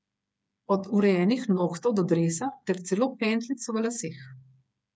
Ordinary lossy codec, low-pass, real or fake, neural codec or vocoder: none; none; fake; codec, 16 kHz, 8 kbps, FreqCodec, smaller model